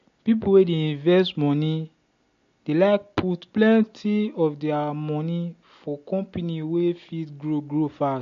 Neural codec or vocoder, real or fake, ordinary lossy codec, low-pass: none; real; MP3, 48 kbps; 7.2 kHz